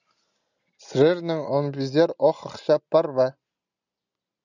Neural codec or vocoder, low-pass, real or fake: none; 7.2 kHz; real